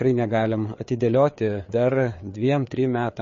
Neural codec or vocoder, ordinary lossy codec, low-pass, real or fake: codec, 16 kHz, 4 kbps, FreqCodec, larger model; MP3, 32 kbps; 7.2 kHz; fake